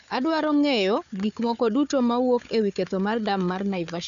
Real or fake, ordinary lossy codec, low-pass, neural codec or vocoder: fake; none; 7.2 kHz; codec, 16 kHz, 4 kbps, FunCodec, trained on Chinese and English, 50 frames a second